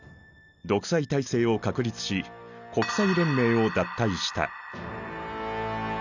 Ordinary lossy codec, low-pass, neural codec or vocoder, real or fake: none; 7.2 kHz; none; real